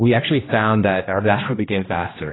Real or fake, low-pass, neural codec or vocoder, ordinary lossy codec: fake; 7.2 kHz; codec, 16 kHz, 1 kbps, X-Codec, HuBERT features, trained on general audio; AAC, 16 kbps